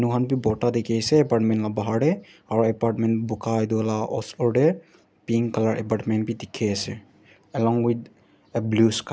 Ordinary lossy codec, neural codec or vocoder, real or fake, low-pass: none; none; real; none